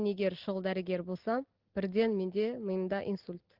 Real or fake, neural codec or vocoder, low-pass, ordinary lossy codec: real; none; 5.4 kHz; Opus, 16 kbps